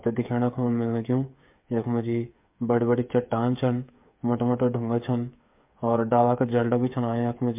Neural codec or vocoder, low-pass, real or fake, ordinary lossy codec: codec, 16 kHz, 8 kbps, FreqCodec, smaller model; 3.6 kHz; fake; MP3, 32 kbps